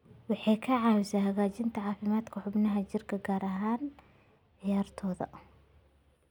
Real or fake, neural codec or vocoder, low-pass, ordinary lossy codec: real; none; 19.8 kHz; none